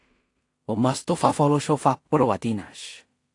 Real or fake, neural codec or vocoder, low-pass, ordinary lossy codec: fake; codec, 16 kHz in and 24 kHz out, 0.4 kbps, LongCat-Audio-Codec, two codebook decoder; 10.8 kHz; AAC, 48 kbps